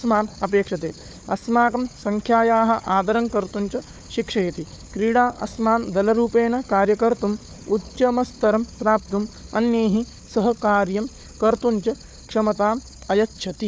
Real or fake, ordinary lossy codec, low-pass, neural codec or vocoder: fake; none; none; codec, 16 kHz, 4 kbps, FunCodec, trained on Chinese and English, 50 frames a second